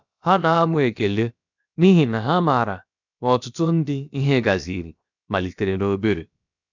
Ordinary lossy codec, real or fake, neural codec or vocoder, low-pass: none; fake; codec, 16 kHz, about 1 kbps, DyCAST, with the encoder's durations; 7.2 kHz